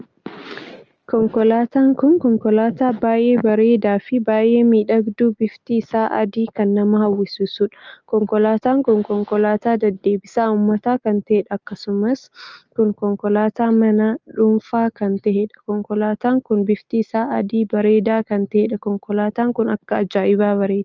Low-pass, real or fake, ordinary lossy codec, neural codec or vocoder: 7.2 kHz; real; Opus, 24 kbps; none